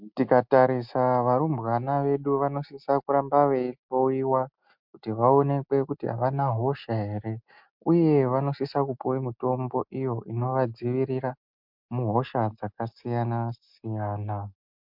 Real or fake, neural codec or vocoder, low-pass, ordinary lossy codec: real; none; 5.4 kHz; MP3, 48 kbps